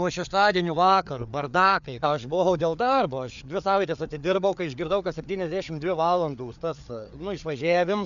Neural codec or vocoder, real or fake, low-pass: codec, 16 kHz, 4 kbps, FreqCodec, larger model; fake; 7.2 kHz